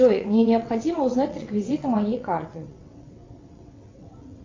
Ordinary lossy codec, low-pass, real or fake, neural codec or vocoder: AAC, 32 kbps; 7.2 kHz; fake; vocoder, 22.05 kHz, 80 mel bands, WaveNeXt